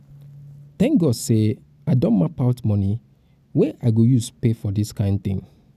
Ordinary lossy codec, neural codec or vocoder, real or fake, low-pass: none; none; real; 14.4 kHz